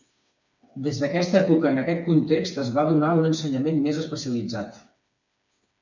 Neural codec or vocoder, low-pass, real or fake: codec, 16 kHz, 4 kbps, FreqCodec, smaller model; 7.2 kHz; fake